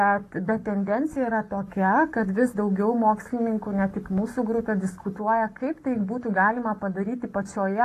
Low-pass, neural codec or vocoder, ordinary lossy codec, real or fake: 14.4 kHz; codec, 44.1 kHz, 7.8 kbps, Pupu-Codec; AAC, 48 kbps; fake